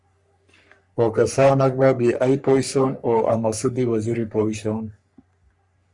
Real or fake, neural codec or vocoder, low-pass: fake; codec, 44.1 kHz, 3.4 kbps, Pupu-Codec; 10.8 kHz